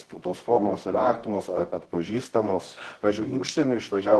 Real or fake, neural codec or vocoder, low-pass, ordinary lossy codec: fake; codec, 24 kHz, 0.9 kbps, WavTokenizer, medium music audio release; 10.8 kHz; Opus, 16 kbps